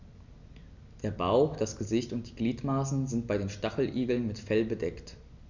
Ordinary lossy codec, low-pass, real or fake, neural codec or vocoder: none; 7.2 kHz; real; none